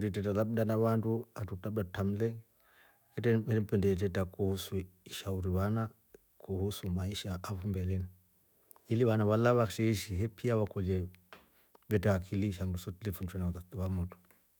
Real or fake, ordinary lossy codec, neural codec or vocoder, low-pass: fake; none; autoencoder, 48 kHz, 128 numbers a frame, DAC-VAE, trained on Japanese speech; none